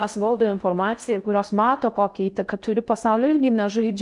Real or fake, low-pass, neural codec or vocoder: fake; 10.8 kHz; codec, 16 kHz in and 24 kHz out, 0.6 kbps, FocalCodec, streaming, 4096 codes